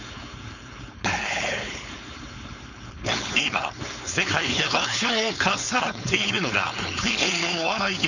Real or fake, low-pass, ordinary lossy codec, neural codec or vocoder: fake; 7.2 kHz; none; codec, 16 kHz, 4.8 kbps, FACodec